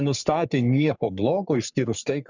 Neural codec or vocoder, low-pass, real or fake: codec, 44.1 kHz, 3.4 kbps, Pupu-Codec; 7.2 kHz; fake